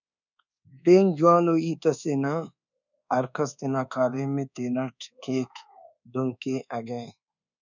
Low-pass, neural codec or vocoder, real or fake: 7.2 kHz; codec, 24 kHz, 1.2 kbps, DualCodec; fake